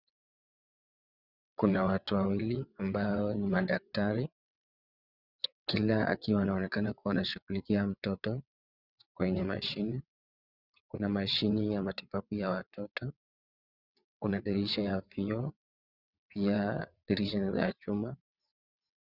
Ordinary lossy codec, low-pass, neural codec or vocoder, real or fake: Opus, 64 kbps; 5.4 kHz; vocoder, 22.05 kHz, 80 mel bands, WaveNeXt; fake